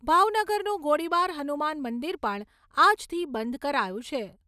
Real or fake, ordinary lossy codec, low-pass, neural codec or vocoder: real; none; 14.4 kHz; none